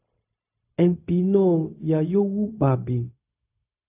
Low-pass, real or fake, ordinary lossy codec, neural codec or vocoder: 3.6 kHz; fake; AAC, 32 kbps; codec, 16 kHz, 0.4 kbps, LongCat-Audio-Codec